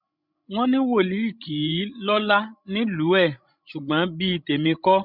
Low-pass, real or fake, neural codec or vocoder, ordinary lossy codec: 5.4 kHz; fake; codec, 16 kHz, 16 kbps, FreqCodec, larger model; none